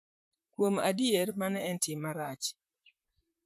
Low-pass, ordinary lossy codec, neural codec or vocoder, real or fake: 14.4 kHz; none; vocoder, 44.1 kHz, 128 mel bands, Pupu-Vocoder; fake